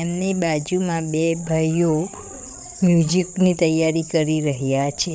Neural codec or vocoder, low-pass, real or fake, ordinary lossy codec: codec, 16 kHz, 8 kbps, FreqCodec, larger model; none; fake; none